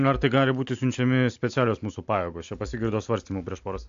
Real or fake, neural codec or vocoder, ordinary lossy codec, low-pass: real; none; AAC, 64 kbps; 7.2 kHz